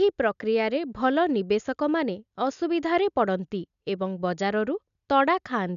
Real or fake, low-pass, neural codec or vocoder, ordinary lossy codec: real; 7.2 kHz; none; none